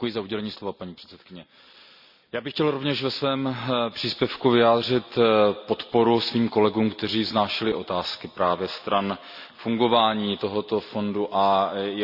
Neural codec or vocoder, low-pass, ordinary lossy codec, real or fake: none; 5.4 kHz; none; real